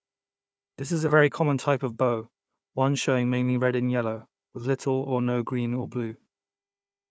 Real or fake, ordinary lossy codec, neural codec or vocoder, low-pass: fake; none; codec, 16 kHz, 4 kbps, FunCodec, trained on Chinese and English, 50 frames a second; none